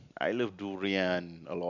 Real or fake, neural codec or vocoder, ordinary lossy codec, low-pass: real; none; none; 7.2 kHz